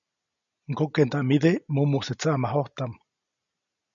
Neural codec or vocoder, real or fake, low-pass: none; real; 7.2 kHz